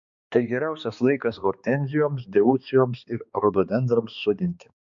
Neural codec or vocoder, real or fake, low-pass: codec, 16 kHz, 2 kbps, X-Codec, HuBERT features, trained on balanced general audio; fake; 7.2 kHz